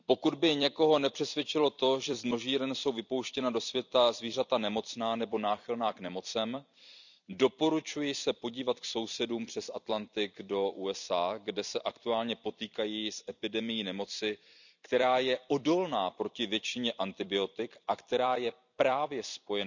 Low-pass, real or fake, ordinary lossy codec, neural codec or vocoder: 7.2 kHz; fake; none; vocoder, 44.1 kHz, 128 mel bands every 256 samples, BigVGAN v2